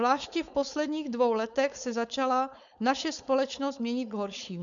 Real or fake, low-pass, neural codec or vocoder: fake; 7.2 kHz; codec, 16 kHz, 4.8 kbps, FACodec